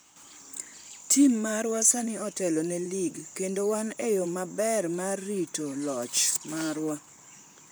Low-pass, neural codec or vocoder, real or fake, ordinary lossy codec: none; vocoder, 44.1 kHz, 128 mel bands, Pupu-Vocoder; fake; none